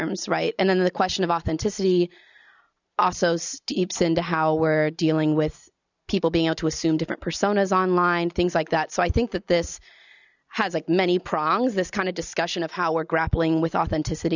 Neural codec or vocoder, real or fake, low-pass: none; real; 7.2 kHz